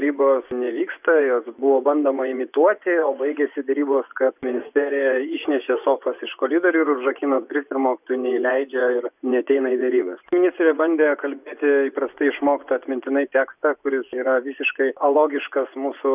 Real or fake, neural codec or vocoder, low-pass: fake; vocoder, 24 kHz, 100 mel bands, Vocos; 3.6 kHz